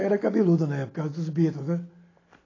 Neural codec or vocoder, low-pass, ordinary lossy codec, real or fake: none; 7.2 kHz; AAC, 32 kbps; real